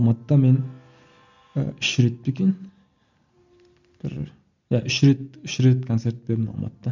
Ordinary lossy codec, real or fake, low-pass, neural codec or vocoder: none; real; 7.2 kHz; none